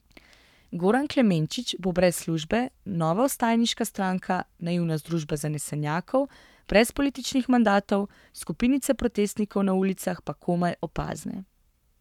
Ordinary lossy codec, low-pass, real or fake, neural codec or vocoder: none; 19.8 kHz; fake; codec, 44.1 kHz, 7.8 kbps, Pupu-Codec